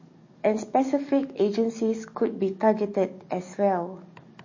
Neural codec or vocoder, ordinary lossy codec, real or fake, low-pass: codec, 44.1 kHz, 7.8 kbps, DAC; MP3, 32 kbps; fake; 7.2 kHz